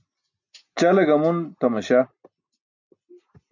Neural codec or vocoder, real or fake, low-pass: none; real; 7.2 kHz